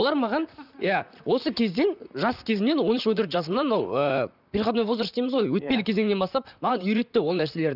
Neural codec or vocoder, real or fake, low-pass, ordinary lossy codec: vocoder, 44.1 kHz, 128 mel bands, Pupu-Vocoder; fake; 5.4 kHz; none